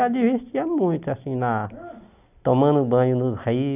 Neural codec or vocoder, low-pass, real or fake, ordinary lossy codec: none; 3.6 kHz; real; none